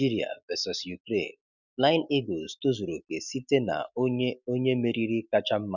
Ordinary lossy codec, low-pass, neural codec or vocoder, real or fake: none; 7.2 kHz; none; real